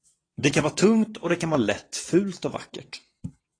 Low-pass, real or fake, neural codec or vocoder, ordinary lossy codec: 9.9 kHz; fake; vocoder, 22.05 kHz, 80 mel bands, WaveNeXt; AAC, 32 kbps